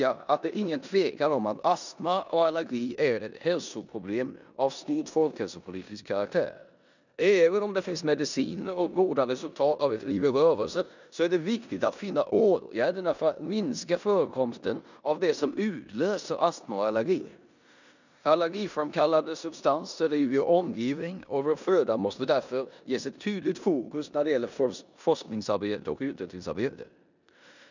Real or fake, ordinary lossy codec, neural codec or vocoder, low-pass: fake; none; codec, 16 kHz in and 24 kHz out, 0.9 kbps, LongCat-Audio-Codec, four codebook decoder; 7.2 kHz